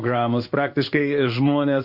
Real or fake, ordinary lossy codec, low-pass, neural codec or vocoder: fake; Opus, 64 kbps; 5.4 kHz; codec, 16 kHz in and 24 kHz out, 1 kbps, XY-Tokenizer